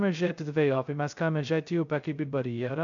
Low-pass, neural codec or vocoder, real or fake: 7.2 kHz; codec, 16 kHz, 0.2 kbps, FocalCodec; fake